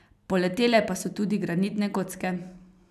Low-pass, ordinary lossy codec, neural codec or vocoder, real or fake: 14.4 kHz; none; none; real